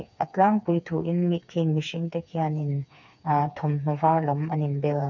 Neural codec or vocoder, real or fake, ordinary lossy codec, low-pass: codec, 16 kHz, 4 kbps, FreqCodec, smaller model; fake; none; 7.2 kHz